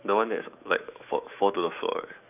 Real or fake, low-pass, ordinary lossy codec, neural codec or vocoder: fake; 3.6 kHz; none; autoencoder, 48 kHz, 128 numbers a frame, DAC-VAE, trained on Japanese speech